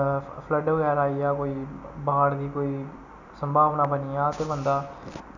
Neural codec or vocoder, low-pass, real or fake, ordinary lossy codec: none; 7.2 kHz; real; none